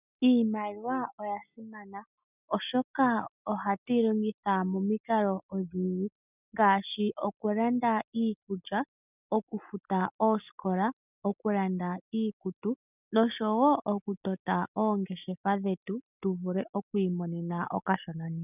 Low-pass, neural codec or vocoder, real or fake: 3.6 kHz; none; real